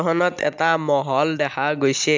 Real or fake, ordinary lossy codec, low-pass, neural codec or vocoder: real; MP3, 64 kbps; 7.2 kHz; none